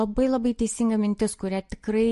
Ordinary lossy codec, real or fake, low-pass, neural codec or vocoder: MP3, 48 kbps; real; 14.4 kHz; none